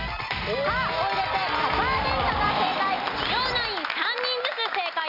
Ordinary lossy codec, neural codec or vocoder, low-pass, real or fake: none; none; 5.4 kHz; real